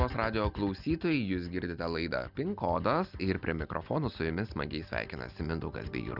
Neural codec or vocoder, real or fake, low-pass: none; real; 5.4 kHz